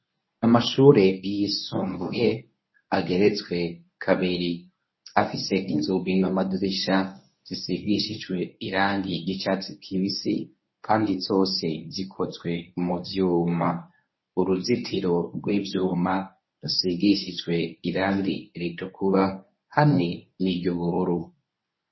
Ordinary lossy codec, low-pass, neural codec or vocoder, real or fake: MP3, 24 kbps; 7.2 kHz; codec, 24 kHz, 0.9 kbps, WavTokenizer, medium speech release version 1; fake